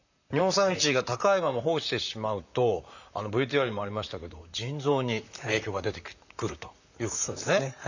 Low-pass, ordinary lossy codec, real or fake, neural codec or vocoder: 7.2 kHz; none; fake; vocoder, 44.1 kHz, 128 mel bands, Pupu-Vocoder